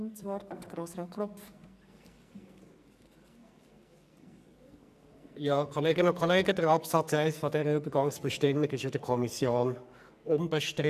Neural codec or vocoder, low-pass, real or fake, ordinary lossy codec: codec, 44.1 kHz, 2.6 kbps, SNAC; 14.4 kHz; fake; none